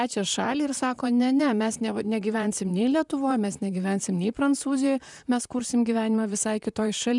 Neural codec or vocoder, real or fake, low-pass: vocoder, 44.1 kHz, 128 mel bands, Pupu-Vocoder; fake; 10.8 kHz